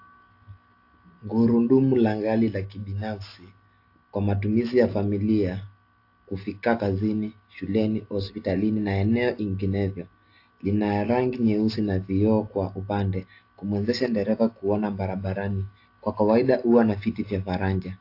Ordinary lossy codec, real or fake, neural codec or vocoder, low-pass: AAC, 32 kbps; real; none; 5.4 kHz